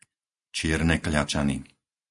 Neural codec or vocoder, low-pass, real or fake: none; 10.8 kHz; real